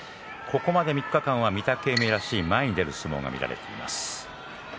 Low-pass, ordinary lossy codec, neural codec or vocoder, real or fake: none; none; none; real